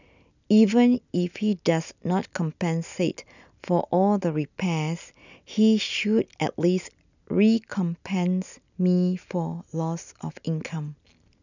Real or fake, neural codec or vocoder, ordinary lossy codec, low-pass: real; none; none; 7.2 kHz